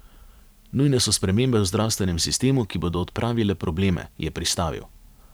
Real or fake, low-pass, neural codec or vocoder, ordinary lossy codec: fake; none; vocoder, 44.1 kHz, 128 mel bands every 512 samples, BigVGAN v2; none